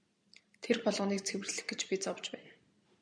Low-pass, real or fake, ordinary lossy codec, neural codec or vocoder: 9.9 kHz; real; MP3, 96 kbps; none